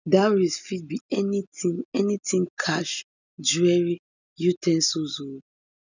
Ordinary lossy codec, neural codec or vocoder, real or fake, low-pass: none; none; real; 7.2 kHz